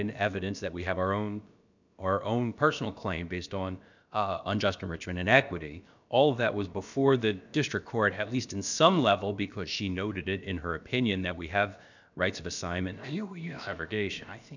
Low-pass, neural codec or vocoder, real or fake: 7.2 kHz; codec, 16 kHz, about 1 kbps, DyCAST, with the encoder's durations; fake